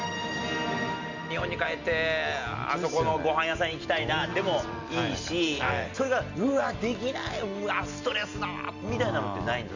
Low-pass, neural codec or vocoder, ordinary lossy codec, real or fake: 7.2 kHz; none; none; real